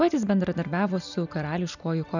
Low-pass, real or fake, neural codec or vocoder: 7.2 kHz; real; none